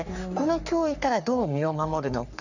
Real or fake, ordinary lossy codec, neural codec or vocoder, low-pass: fake; none; codec, 44.1 kHz, 3.4 kbps, Pupu-Codec; 7.2 kHz